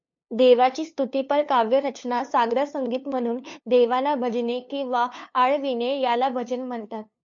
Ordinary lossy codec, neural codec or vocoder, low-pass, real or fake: MP3, 48 kbps; codec, 16 kHz, 2 kbps, FunCodec, trained on LibriTTS, 25 frames a second; 7.2 kHz; fake